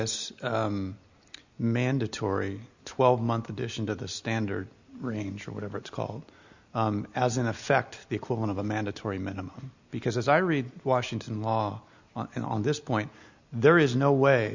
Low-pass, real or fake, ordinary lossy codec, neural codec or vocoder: 7.2 kHz; real; Opus, 64 kbps; none